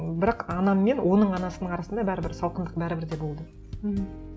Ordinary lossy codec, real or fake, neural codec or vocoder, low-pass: none; real; none; none